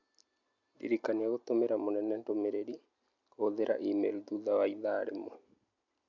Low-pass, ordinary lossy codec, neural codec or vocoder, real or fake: 7.2 kHz; MP3, 64 kbps; none; real